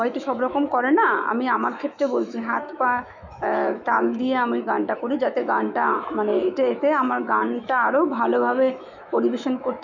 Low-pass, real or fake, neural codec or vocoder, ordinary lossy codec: 7.2 kHz; fake; autoencoder, 48 kHz, 128 numbers a frame, DAC-VAE, trained on Japanese speech; none